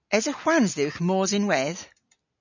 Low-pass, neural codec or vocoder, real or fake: 7.2 kHz; none; real